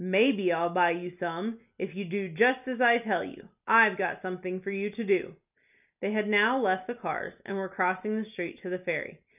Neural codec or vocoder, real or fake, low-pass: none; real; 3.6 kHz